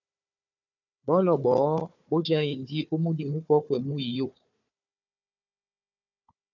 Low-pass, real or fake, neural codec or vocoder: 7.2 kHz; fake; codec, 16 kHz, 4 kbps, FunCodec, trained on Chinese and English, 50 frames a second